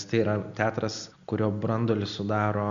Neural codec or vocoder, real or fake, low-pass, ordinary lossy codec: none; real; 7.2 kHz; Opus, 64 kbps